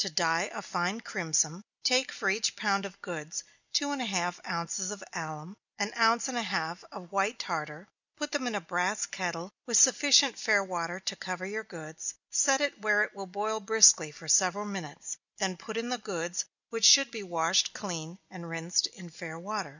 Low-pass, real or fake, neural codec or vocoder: 7.2 kHz; real; none